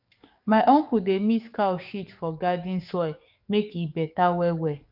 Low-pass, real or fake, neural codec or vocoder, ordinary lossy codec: 5.4 kHz; fake; codec, 44.1 kHz, 7.8 kbps, DAC; none